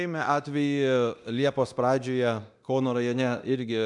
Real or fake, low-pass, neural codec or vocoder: fake; 10.8 kHz; codec, 24 kHz, 0.9 kbps, DualCodec